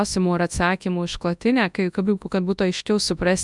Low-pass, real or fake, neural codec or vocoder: 10.8 kHz; fake; codec, 24 kHz, 0.9 kbps, WavTokenizer, large speech release